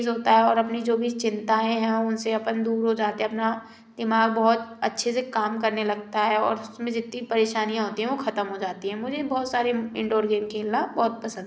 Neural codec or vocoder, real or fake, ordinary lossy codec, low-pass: none; real; none; none